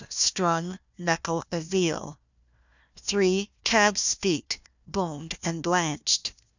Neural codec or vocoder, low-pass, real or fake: codec, 16 kHz, 1 kbps, FunCodec, trained on Chinese and English, 50 frames a second; 7.2 kHz; fake